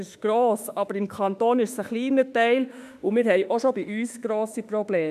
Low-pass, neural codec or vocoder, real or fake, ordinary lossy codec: 14.4 kHz; autoencoder, 48 kHz, 32 numbers a frame, DAC-VAE, trained on Japanese speech; fake; none